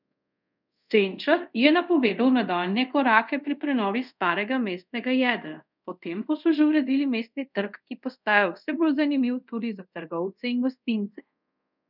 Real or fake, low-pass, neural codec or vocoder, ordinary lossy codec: fake; 5.4 kHz; codec, 24 kHz, 0.5 kbps, DualCodec; none